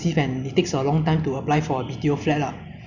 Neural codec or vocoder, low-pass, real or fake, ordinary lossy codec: none; 7.2 kHz; real; none